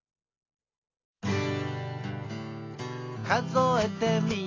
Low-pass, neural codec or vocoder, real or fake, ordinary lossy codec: 7.2 kHz; none; real; AAC, 32 kbps